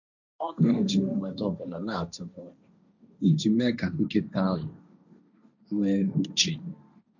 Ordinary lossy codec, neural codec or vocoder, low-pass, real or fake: none; codec, 16 kHz, 1.1 kbps, Voila-Tokenizer; 7.2 kHz; fake